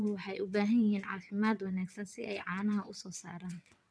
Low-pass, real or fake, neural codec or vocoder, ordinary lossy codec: none; fake; vocoder, 22.05 kHz, 80 mel bands, WaveNeXt; none